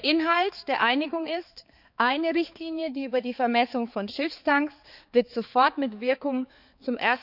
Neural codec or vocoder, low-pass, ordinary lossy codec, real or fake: codec, 16 kHz, 4 kbps, X-Codec, HuBERT features, trained on balanced general audio; 5.4 kHz; none; fake